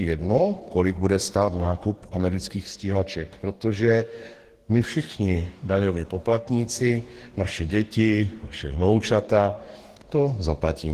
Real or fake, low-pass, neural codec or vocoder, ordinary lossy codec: fake; 14.4 kHz; codec, 44.1 kHz, 2.6 kbps, DAC; Opus, 16 kbps